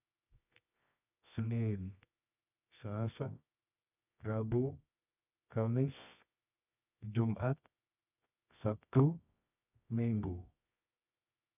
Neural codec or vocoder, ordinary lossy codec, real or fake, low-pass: codec, 24 kHz, 0.9 kbps, WavTokenizer, medium music audio release; none; fake; 3.6 kHz